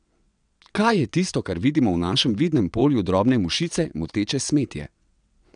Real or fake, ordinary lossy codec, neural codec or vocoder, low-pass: fake; none; vocoder, 22.05 kHz, 80 mel bands, WaveNeXt; 9.9 kHz